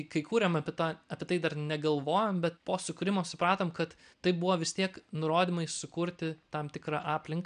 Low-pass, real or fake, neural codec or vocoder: 9.9 kHz; real; none